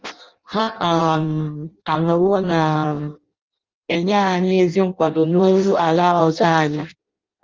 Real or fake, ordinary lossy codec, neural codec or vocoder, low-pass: fake; Opus, 24 kbps; codec, 16 kHz in and 24 kHz out, 0.6 kbps, FireRedTTS-2 codec; 7.2 kHz